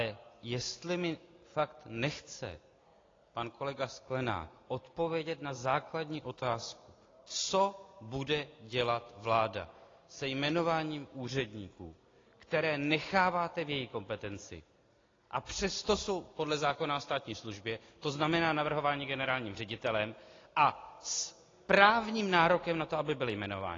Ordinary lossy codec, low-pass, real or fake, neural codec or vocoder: AAC, 32 kbps; 7.2 kHz; real; none